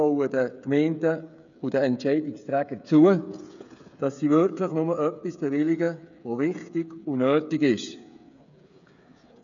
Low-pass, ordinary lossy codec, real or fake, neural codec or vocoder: 7.2 kHz; none; fake; codec, 16 kHz, 8 kbps, FreqCodec, smaller model